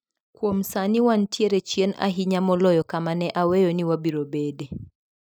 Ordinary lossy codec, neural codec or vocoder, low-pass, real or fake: none; none; none; real